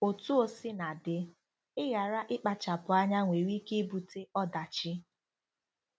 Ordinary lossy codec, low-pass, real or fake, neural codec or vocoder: none; none; real; none